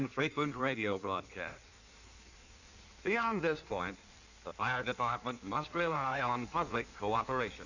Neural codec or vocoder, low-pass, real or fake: codec, 16 kHz in and 24 kHz out, 1.1 kbps, FireRedTTS-2 codec; 7.2 kHz; fake